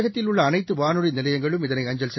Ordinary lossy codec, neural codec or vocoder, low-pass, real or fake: MP3, 24 kbps; none; 7.2 kHz; real